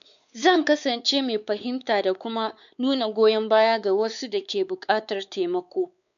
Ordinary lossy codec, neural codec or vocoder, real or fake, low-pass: none; codec, 16 kHz, 4 kbps, X-Codec, WavLM features, trained on Multilingual LibriSpeech; fake; 7.2 kHz